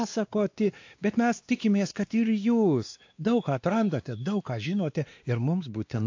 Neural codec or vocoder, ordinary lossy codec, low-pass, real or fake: codec, 16 kHz, 2 kbps, X-Codec, WavLM features, trained on Multilingual LibriSpeech; AAC, 48 kbps; 7.2 kHz; fake